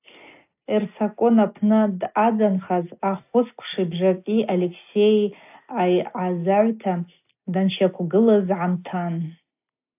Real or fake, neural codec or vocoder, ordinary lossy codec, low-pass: real; none; AAC, 32 kbps; 3.6 kHz